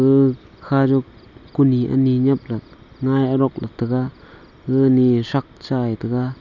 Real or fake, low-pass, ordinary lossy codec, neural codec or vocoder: real; 7.2 kHz; none; none